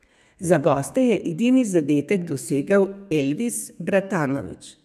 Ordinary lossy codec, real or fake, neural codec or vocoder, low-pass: none; fake; codec, 32 kHz, 1.9 kbps, SNAC; 14.4 kHz